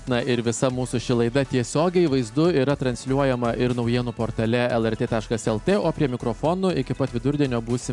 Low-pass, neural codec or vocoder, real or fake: 10.8 kHz; none; real